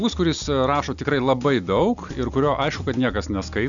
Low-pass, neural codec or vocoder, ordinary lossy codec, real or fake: 7.2 kHz; none; MP3, 96 kbps; real